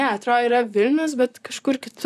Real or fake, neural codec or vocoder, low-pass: fake; vocoder, 44.1 kHz, 128 mel bands, Pupu-Vocoder; 14.4 kHz